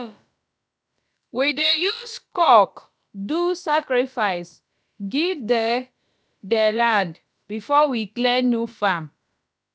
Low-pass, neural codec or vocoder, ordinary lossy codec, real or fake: none; codec, 16 kHz, about 1 kbps, DyCAST, with the encoder's durations; none; fake